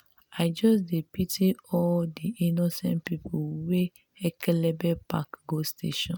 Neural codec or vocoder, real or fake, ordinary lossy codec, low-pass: none; real; none; none